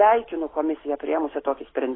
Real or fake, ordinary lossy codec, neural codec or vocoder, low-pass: real; AAC, 16 kbps; none; 7.2 kHz